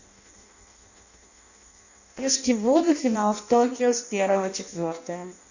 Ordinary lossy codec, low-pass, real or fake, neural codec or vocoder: none; 7.2 kHz; fake; codec, 16 kHz in and 24 kHz out, 0.6 kbps, FireRedTTS-2 codec